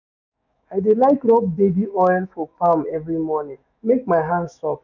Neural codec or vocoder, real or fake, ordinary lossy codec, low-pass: autoencoder, 48 kHz, 128 numbers a frame, DAC-VAE, trained on Japanese speech; fake; none; 7.2 kHz